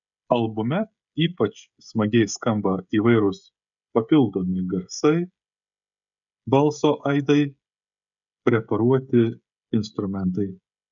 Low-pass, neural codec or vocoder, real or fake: 7.2 kHz; codec, 16 kHz, 16 kbps, FreqCodec, smaller model; fake